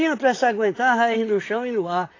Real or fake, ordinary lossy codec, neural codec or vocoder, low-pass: fake; AAC, 32 kbps; vocoder, 44.1 kHz, 128 mel bands, Pupu-Vocoder; 7.2 kHz